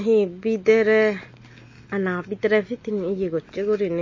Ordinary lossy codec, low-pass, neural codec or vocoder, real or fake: MP3, 32 kbps; 7.2 kHz; none; real